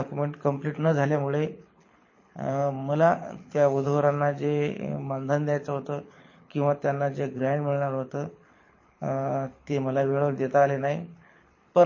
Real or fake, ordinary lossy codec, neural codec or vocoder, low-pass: fake; MP3, 32 kbps; codec, 24 kHz, 6 kbps, HILCodec; 7.2 kHz